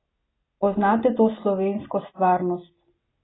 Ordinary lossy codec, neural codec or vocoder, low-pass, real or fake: AAC, 16 kbps; none; 7.2 kHz; real